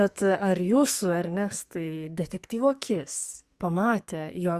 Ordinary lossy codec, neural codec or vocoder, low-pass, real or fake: Opus, 64 kbps; codec, 44.1 kHz, 2.6 kbps, SNAC; 14.4 kHz; fake